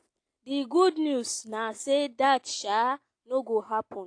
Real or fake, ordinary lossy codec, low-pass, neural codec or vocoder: real; AAC, 48 kbps; 9.9 kHz; none